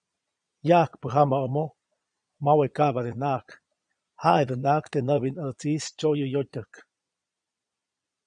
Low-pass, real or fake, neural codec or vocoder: 9.9 kHz; fake; vocoder, 22.05 kHz, 80 mel bands, Vocos